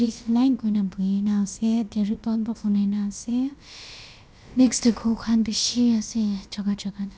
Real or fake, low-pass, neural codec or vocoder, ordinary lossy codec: fake; none; codec, 16 kHz, about 1 kbps, DyCAST, with the encoder's durations; none